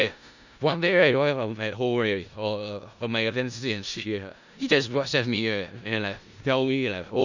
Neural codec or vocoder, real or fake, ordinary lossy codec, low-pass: codec, 16 kHz in and 24 kHz out, 0.4 kbps, LongCat-Audio-Codec, four codebook decoder; fake; none; 7.2 kHz